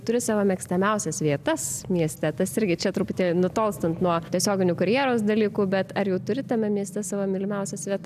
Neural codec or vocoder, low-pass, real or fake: none; 14.4 kHz; real